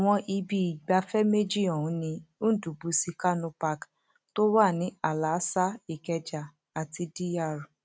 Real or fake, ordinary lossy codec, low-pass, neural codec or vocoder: real; none; none; none